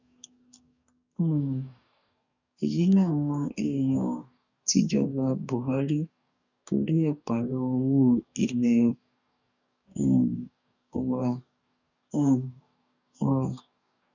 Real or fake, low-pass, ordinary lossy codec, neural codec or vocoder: fake; 7.2 kHz; none; codec, 44.1 kHz, 2.6 kbps, DAC